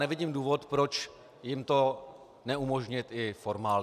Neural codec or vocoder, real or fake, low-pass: none; real; 14.4 kHz